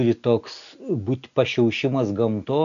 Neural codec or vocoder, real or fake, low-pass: none; real; 7.2 kHz